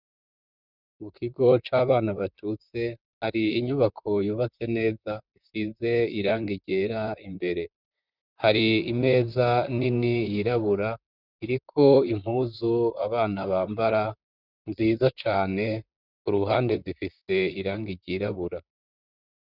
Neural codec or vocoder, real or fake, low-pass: vocoder, 44.1 kHz, 128 mel bands, Pupu-Vocoder; fake; 5.4 kHz